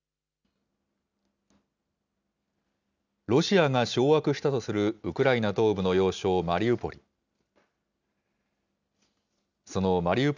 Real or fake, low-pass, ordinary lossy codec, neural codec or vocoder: real; 7.2 kHz; none; none